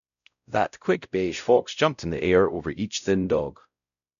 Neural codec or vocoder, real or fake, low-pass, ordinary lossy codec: codec, 16 kHz, 0.5 kbps, X-Codec, WavLM features, trained on Multilingual LibriSpeech; fake; 7.2 kHz; none